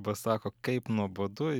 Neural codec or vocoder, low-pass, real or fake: none; 19.8 kHz; real